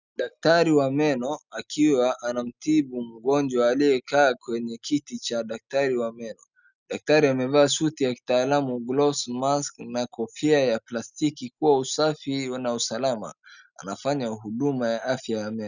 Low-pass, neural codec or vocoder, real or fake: 7.2 kHz; none; real